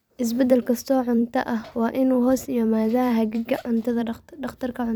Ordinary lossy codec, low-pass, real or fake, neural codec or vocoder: none; none; fake; vocoder, 44.1 kHz, 128 mel bands every 256 samples, BigVGAN v2